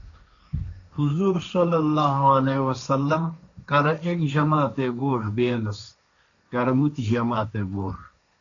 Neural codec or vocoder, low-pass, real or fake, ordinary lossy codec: codec, 16 kHz, 1.1 kbps, Voila-Tokenizer; 7.2 kHz; fake; MP3, 96 kbps